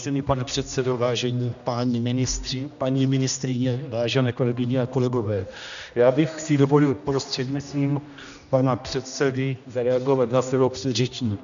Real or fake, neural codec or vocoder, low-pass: fake; codec, 16 kHz, 1 kbps, X-Codec, HuBERT features, trained on general audio; 7.2 kHz